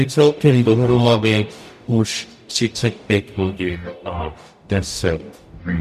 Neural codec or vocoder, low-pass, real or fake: codec, 44.1 kHz, 0.9 kbps, DAC; 14.4 kHz; fake